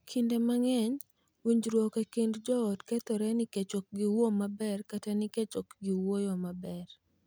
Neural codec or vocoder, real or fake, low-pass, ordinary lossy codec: none; real; none; none